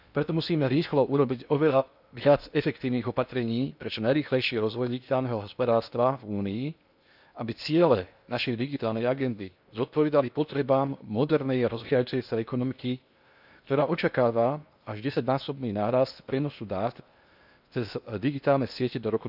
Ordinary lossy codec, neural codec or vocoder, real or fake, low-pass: none; codec, 16 kHz in and 24 kHz out, 0.8 kbps, FocalCodec, streaming, 65536 codes; fake; 5.4 kHz